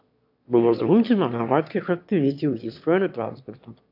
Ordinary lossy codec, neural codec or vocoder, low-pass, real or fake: AAC, 48 kbps; autoencoder, 22.05 kHz, a latent of 192 numbers a frame, VITS, trained on one speaker; 5.4 kHz; fake